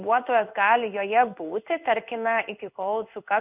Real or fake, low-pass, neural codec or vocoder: fake; 3.6 kHz; codec, 16 kHz in and 24 kHz out, 1 kbps, XY-Tokenizer